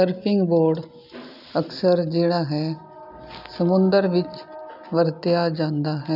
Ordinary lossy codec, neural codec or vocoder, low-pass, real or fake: none; none; 5.4 kHz; real